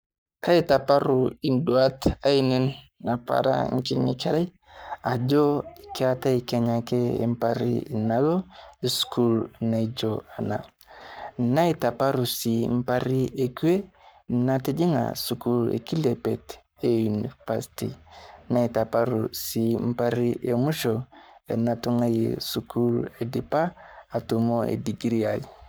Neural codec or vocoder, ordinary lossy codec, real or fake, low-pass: codec, 44.1 kHz, 7.8 kbps, Pupu-Codec; none; fake; none